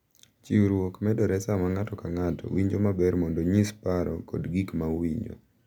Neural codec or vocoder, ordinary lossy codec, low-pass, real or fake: none; none; 19.8 kHz; real